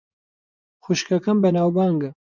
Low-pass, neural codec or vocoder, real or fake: 7.2 kHz; vocoder, 24 kHz, 100 mel bands, Vocos; fake